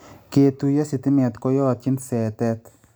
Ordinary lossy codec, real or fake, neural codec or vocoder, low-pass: none; real; none; none